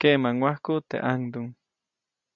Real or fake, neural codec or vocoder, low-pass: real; none; 7.2 kHz